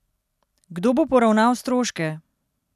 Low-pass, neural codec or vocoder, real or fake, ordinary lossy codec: 14.4 kHz; none; real; none